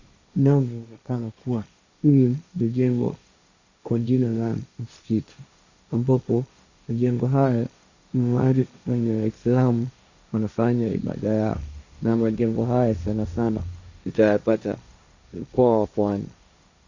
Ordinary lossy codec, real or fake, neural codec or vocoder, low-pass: Opus, 64 kbps; fake; codec, 16 kHz, 1.1 kbps, Voila-Tokenizer; 7.2 kHz